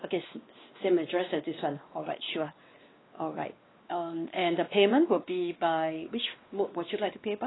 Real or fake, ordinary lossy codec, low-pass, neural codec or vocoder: fake; AAC, 16 kbps; 7.2 kHz; codec, 16 kHz, 2 kbps, X-Codec, WavLM features, trained on Multilingual LibriSpeech